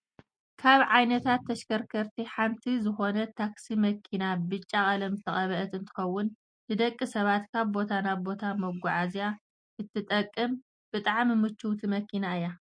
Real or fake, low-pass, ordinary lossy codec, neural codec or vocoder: real; 9.9 kHz; MP3, 48 kbps; none